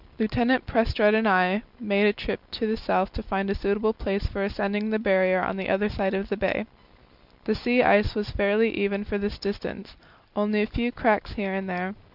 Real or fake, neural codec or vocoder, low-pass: real; none; 5.4 kHz